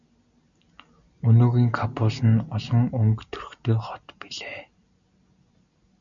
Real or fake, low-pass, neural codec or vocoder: real; 7.2 kHz; none